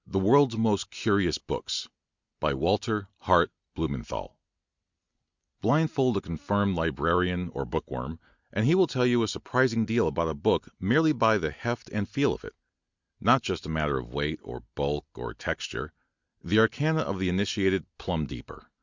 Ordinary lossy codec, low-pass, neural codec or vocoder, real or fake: Opus, 64 kbps; 7.2 kHz; none; real